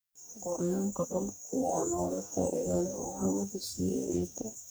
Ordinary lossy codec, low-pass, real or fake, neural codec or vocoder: none; none; fake; codec, 44.1 kHz, 2.6 kbps, DAC